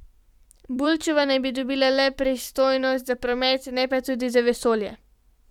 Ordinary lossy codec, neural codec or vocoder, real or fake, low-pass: none; vocoder, 44.1 kHz, 128 mel bands every 256 samples, BigVGAN v2; fake; 19.8 kHz